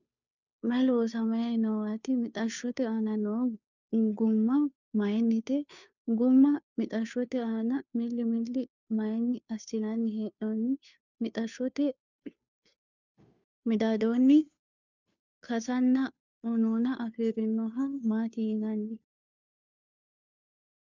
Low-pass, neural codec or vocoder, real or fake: 7.2 kHz; codec, 16 kHz, 2 kbps, FunCodec, trained on Chinese and English, 25 frames a second; fake